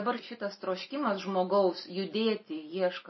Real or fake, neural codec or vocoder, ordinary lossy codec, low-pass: real; none; MP3, 24 kbps; 7.2 kHz